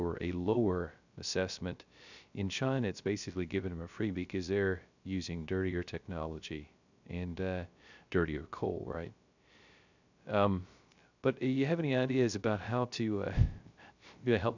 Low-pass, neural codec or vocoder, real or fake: 7.2 kHz; codec, 16 kHz, 0.3 kbps, FocalCodec; fake